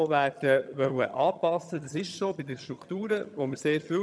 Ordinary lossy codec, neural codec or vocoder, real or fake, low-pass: none; vocoder, 22.05 kHz, 80 mel bands, HiFi-GAN; fake; none